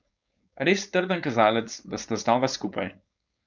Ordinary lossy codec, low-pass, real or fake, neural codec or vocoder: none; 7.2 kHz; fake; codec, 16 kHz, 4.8 kbps, FACodec